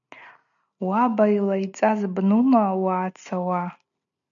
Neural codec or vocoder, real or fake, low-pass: none; real; 7.2 kHz